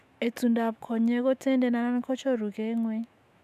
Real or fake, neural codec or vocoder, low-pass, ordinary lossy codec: fake; autoencoder, 48 kHz, 128 numbers a frame, DAC-VAE, trained on Japanese speech; 14.4 kHz; none